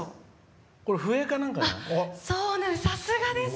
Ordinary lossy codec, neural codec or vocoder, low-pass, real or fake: none; none; none; real